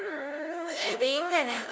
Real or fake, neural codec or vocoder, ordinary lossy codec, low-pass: fake; codec, 16 kHz, 0.5 kbps, FunCodec, trained on LibriTTS, 25 frames a second; none; none